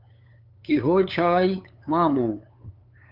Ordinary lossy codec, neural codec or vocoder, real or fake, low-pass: Opus, 32 kbps; codec, 16 kHz, 8 kbps, FunCodec, trained on LibriTTS, 25 frames a second; fake; 5.4 kHz